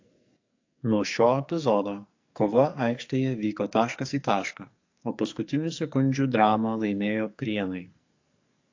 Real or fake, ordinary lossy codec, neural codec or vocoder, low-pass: fake; AAC, 48 kbps; codec, 44.1 kHz, 2.6 kbps, SNAC; 7.2 kHz